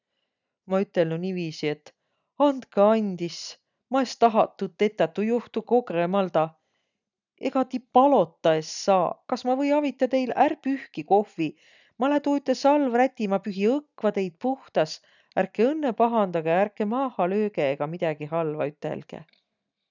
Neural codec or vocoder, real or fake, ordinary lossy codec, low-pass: none; real; none; 7.2 kHz